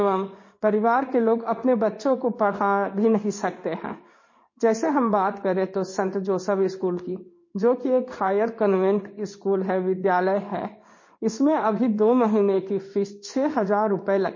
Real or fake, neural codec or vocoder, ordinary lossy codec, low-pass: fake; codec, 16 kHz in and 24 kHz out, 1 kbps, XY-Tokenizer; MP3, 32 kbps; 7.2 kHz